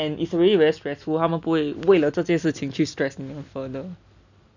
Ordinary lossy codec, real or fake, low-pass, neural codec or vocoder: none; real; 7.2 kHz; none